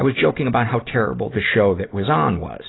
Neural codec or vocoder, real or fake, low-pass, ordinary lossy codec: none; real; 7.2 kHz; AAC, 16 kbps